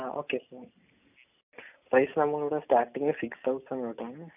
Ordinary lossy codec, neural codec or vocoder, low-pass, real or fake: AAC, 32 kbps; none; 3.6 kHz; real